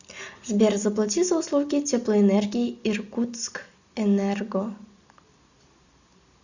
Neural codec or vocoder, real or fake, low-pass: none; real; 7.2 kHz